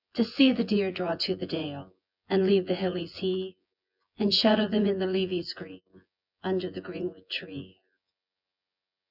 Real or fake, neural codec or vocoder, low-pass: fake; vocoder, 24 kHz, 100 mel bands, Vocos; 5.4 kHz